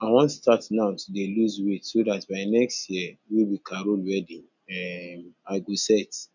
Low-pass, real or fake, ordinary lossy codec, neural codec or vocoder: 7.2 kHz; real; none; none